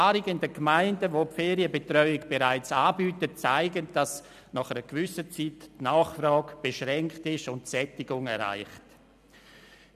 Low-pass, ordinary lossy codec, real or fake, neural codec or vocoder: 14.4 kHz; none; real; none